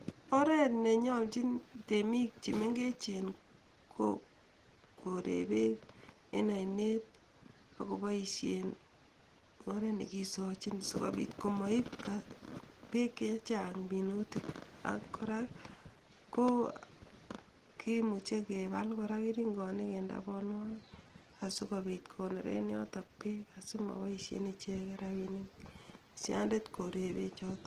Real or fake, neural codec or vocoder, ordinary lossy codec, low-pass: real; none; Opus, 16 kbps; 14.4 kHz